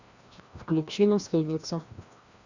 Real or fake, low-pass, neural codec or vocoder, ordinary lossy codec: fake; 7.2 kHz; codec, 16 kHz, 1 kbps, FreqCodec, larger model; Opus, 64 kbps